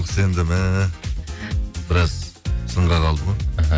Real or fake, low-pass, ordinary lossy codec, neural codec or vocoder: real; none; none; none